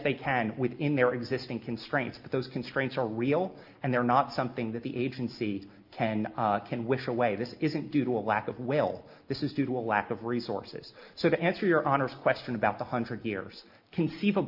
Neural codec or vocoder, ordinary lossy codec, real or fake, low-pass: none; Opus, 24 kbps; real; 5.4 kHz